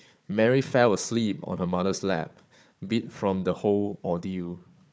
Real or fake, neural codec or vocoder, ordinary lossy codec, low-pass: fake; codec, 16 kHz, 4 kbps, FunCodec, trained on Chinese and English, 50 frames a second; none; none